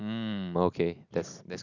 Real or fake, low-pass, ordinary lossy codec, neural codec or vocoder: real; 7.2 kHz; none; none